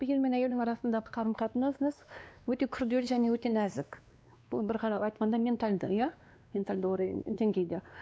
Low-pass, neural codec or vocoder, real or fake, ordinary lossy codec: none; codec, 16 kHz, 2 kbps, X-Codec, WavLM features, trained on Multilingual LibriSpeech; fake; none